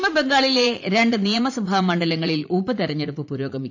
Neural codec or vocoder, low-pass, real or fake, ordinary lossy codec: vocoder, 44.1 kHz, 80 mel bands, Vocos; 7.2 kHz; fake; AAC, 48 kbps